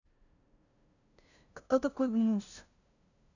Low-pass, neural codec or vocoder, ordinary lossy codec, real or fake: 7.2 kHz; codec, 16 kHz, 0.5 kbps, FunCodec, trained on LibriTTS, 25 frames a second; none; fake